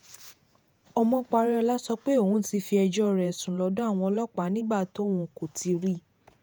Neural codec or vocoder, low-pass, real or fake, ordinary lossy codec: vocoder, 48 kHz, 128 mel bands, Vocos; none; fake; none